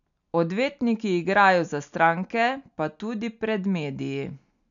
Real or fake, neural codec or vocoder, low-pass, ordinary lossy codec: real; none; 7.2 kHz; none